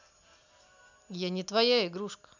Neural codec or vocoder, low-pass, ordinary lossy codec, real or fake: none; 7.2 kHz; none; real